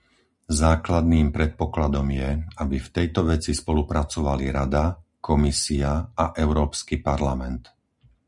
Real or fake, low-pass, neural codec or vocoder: real; 10.8 kHz; none